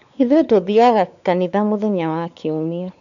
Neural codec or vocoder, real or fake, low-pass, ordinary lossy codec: codec, 16 kHz, 4 kbps, X-Codec, WavLM features, trained on Multilingual LibriSpeech; fake; 7.2 kHz; none